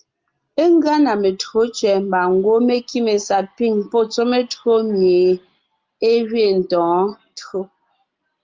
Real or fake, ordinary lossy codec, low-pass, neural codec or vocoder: real; Opus, 32 kbps; 7.2 kHz; none